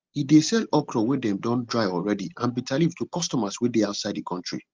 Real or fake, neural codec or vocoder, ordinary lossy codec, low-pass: real; none; Opus, 32 kbps; 7.2 kHz